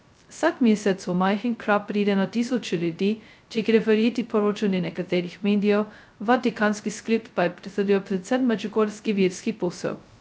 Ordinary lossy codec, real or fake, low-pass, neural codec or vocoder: none; fake; none; codec, 16 kHz, 0.2 kbps, FocalCodec